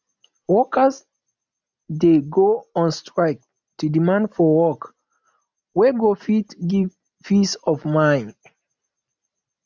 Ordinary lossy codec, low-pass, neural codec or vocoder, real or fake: none; 7.2 kHz; none; real